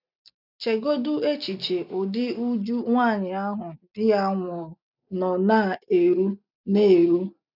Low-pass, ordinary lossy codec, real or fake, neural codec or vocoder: 5.4 kHz; none; real; none